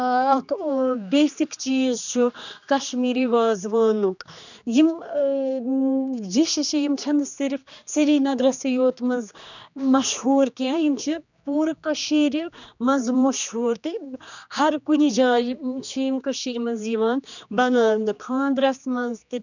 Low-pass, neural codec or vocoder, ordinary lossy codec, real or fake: 7.2 kHz; codec, 16 kHz, 2 kbps, X-Codec, HuBERT features, trained on general audio; none; fake